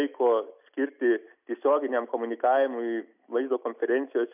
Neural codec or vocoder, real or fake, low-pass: none; real; 3.6 kHz